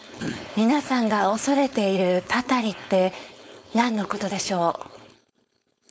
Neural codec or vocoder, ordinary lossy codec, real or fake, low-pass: codec, 16 kHz, 4.8 kbps, FACodec; none; fake; none